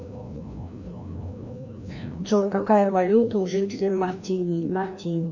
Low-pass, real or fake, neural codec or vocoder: 7.2 kHz; fake; codec, 16 kHz, 1 kbps, FreqCodec, larger model